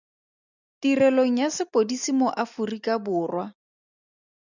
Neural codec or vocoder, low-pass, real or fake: none; 7.2 kHz; real